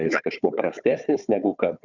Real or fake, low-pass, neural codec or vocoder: fake; 7.2 kHz; codec, 16 kHz, 4 kbps, FunCodec, trained on Chinese and English, 50 frames a second